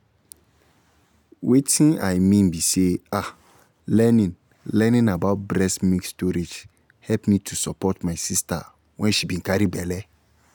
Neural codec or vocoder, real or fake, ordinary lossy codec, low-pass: none; real; none; none